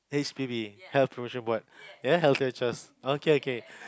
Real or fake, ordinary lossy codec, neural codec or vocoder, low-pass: real; none; none; none